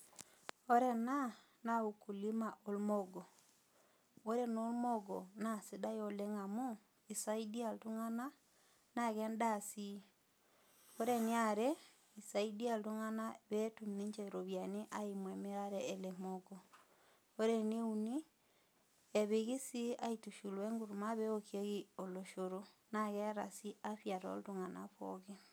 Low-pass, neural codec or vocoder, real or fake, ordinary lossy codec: none; none; real; none